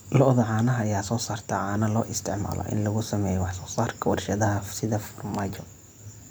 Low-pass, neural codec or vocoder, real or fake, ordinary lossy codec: none; none; real; none